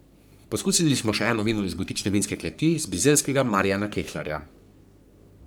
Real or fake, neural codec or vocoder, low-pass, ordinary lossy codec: fake; codec, 44.1 kHz, 3.4 kbps, Pupu-Codec; none; none